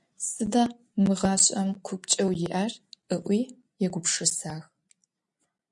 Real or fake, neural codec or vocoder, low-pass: real; none; 10.8 kHz